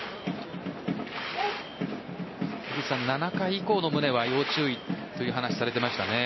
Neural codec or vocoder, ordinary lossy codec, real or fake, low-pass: none; MP3, 24 kbps; real; 7.2 kHz